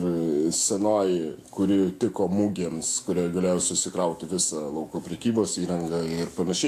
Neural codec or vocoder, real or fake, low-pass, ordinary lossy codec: autoencoder, 48 kHz, 128 numbers a frame, DAC-VAE, trained on Japanese speech; fake; 14.4 kHz; AAC, 64 kbps